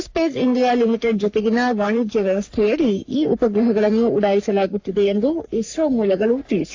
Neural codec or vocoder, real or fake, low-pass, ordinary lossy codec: codec, 44.1 kHz, 3.4 kbps, Pupu-Codec; fake; 7.2 kHz; AAC, 48 kbps